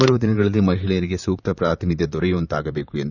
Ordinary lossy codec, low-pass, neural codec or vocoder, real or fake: none; 7.2 kHz; vocoder, 44.1 kHz, 128 mel bands, Pupu-Vocoder; fake